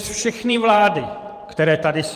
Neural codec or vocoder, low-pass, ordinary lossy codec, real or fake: vocoder, 44.1 kHz, 128 mel bands every 512 samples, BigVGAN v2; 14.4 kHz; Opus, 32 kbps; fake